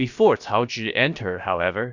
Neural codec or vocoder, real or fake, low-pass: codec, 16 kHz, about 1 kbps, DyCAST, with the encoder's durations; fake; 7.2 kHz